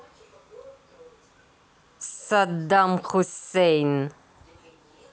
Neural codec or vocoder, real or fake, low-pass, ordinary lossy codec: none; real; none; none